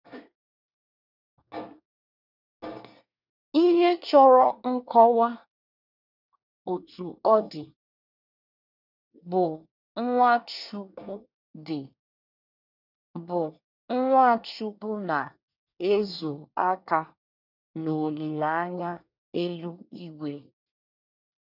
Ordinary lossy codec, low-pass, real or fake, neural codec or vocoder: none; 5.4 kHz; fake; codec, 24 kHz, 1 kbps, SNAC